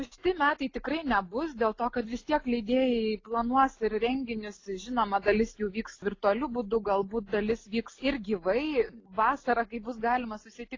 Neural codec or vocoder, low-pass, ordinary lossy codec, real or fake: none; 7.2 kHz; AAC, 32 kbps; real